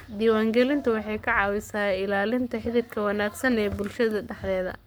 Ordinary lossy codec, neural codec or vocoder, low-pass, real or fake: none; codec, 44.1 kHz, 7.8 kbps, Pupu-Codec; none; fake